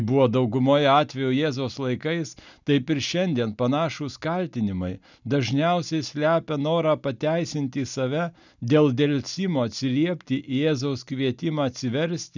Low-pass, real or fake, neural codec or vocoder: 7.2 kHz; real; none